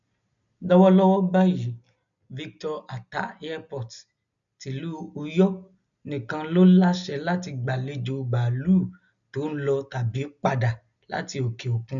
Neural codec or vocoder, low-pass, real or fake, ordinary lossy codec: none; 7.2 kHz; real; none